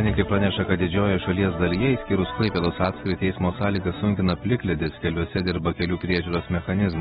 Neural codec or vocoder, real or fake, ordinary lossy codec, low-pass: none; real; AAC, 16 kbps; 19.8 kHz